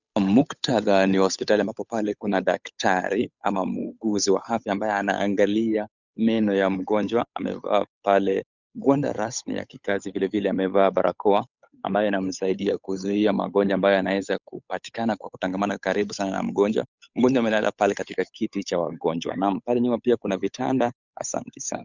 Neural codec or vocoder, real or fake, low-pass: codec, 16 kHz, 8 kbps, FunCodec, trained on Chinese and English, 25 frames a second; fake; 7.2 kHz